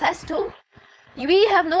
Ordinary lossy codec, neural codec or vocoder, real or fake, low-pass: none; codec, 16 kHz, 4.8 kbps, FACodec; fake; none